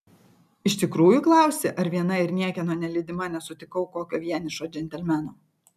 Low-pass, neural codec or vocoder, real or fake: 14.4 kHz; none; real